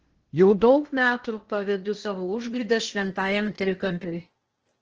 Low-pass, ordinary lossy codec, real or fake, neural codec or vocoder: 7.2 kHz; Opus, 16 kbps; fake; codec, 16 kHz in and 24 kHz out, 0.8 kbps, FocalCodec, streaming, 65536 codes